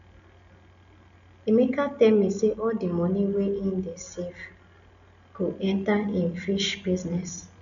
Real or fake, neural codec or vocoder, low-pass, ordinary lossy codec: real; none; 7.2 kHz; none